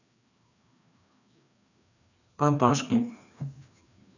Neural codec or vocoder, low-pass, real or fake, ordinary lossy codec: codec, 16 kHz, 2 kbps, FreqCodec, larger model; 7.2 kHz; fake; none